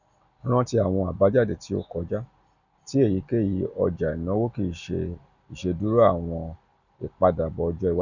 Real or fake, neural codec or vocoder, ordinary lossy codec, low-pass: real; none; none; 7.2 kHz